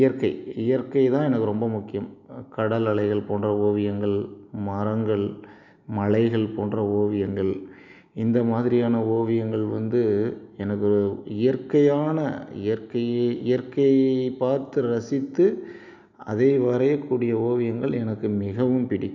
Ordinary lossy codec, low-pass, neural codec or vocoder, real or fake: none; 7.2 kHz; none; real